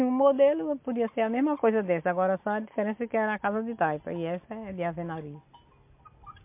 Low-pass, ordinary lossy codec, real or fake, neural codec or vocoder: 3.6 kHz; MP3, 32 kbps; real; none